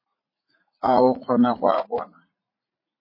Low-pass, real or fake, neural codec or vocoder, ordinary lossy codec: 5.4 kHz; fake; vocoder, 44.1 kHz, 80 mel bands, Vocos; MP3, 24 kbps